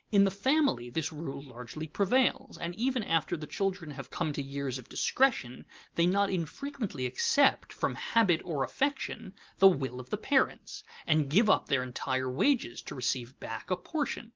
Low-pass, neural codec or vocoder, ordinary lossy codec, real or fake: 7.2 kHz; none; Opus, 32 kbps; real